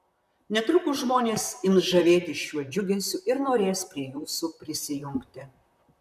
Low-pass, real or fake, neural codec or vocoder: 14.4 kHz; fake; vocoder, 44.1 kHz, 128 mel bands, Pupu-Vocoder